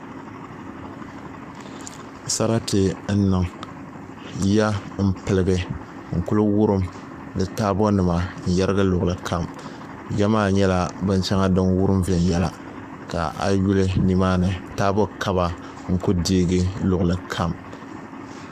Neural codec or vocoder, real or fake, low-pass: codec, 44.1 kHz, 7.8 kbps, Pupu-Codec; fake; 14.4 kHz